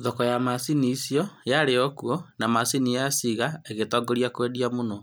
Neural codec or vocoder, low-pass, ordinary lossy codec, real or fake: none; none; none; real